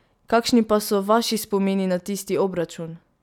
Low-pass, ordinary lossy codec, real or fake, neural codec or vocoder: 19.8 kHz; none; real; none